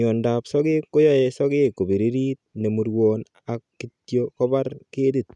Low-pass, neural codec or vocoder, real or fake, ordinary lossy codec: 10.8 kHz; none; real; none